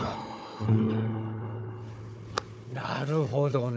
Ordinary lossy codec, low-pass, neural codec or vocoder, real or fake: none; none; codec, 16 kHz, 4 kbps, FunCodec, trained on Chinese and English, 50 frames a second; fake